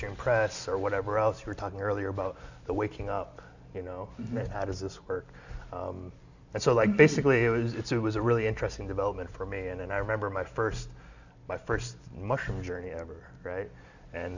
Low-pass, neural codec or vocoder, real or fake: 7.2 kHz; none; real